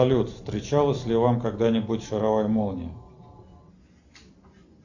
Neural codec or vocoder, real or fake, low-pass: none; real; 7.2 kHz